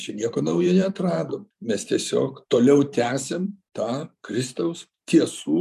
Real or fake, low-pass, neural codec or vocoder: fake; 14.4 kHz; vocoder, 44.1 kHz, 128 mel bands every 512 samples, BigVGAN v2